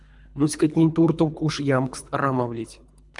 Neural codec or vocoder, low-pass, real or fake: codec, 24 kHz, 3 kbps, HILCodec; 10.8 kHz; fake